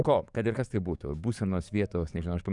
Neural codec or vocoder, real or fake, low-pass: codec, 44.1 kHz, 7.8 kbps, DAC; fake; 10.8 kHz